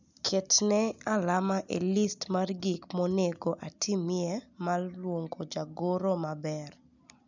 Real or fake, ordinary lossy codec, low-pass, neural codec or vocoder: real; none; 7.2 kHz; none